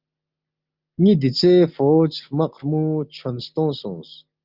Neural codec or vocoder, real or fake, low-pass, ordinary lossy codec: none; real; 5.4 kHz; Opus, 24 kbps